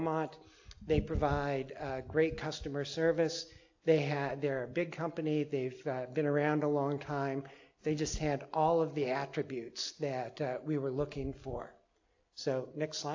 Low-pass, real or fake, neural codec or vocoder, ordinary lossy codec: 7.2 kHz; real; none; AAC, 48 kbps